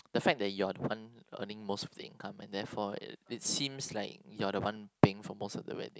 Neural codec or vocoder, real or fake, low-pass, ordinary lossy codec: none; real; none; none